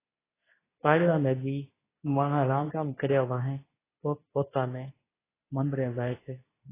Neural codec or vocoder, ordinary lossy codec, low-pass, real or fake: codec, 24 kHz, 0.9 kbps, WavTokenizer, medium speech release version 2; AAC, 16 kbps; 3.6 kHz; fake